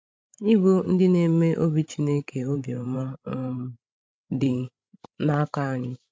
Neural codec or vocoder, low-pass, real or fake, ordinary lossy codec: codec, 16 kHz, 16 kbps, FreqCodec, larger model; none; fake; none